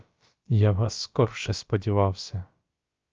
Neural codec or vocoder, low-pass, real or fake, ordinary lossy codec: codec, 16 kHz, about 1 kbps, DyCAST, with the encoder's durations; 7.2 kHz; fake; Opus, 24 kbps